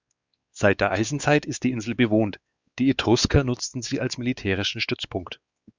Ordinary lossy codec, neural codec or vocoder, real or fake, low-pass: Opus, 64 kbps; codec, 16 kHz, 4 kbps, X-Codec, WavLM features, trained on Multilingual LibriSpeech; fake; 7.2 kHz